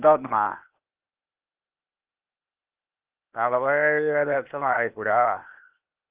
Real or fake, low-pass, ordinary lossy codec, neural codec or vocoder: fake; 3.6 kHz; Opus, 32 kbps; codec, 16 kHz, 0.8 kbps, ZipCodec